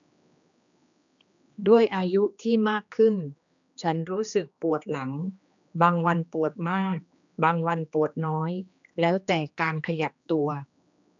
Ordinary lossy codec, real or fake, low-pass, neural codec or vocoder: AAC, 64 kbps; fake; 7.2 kHz; codec, 16 kHz, 2 kbps, X-Codec, HuBERT features, trained on general audio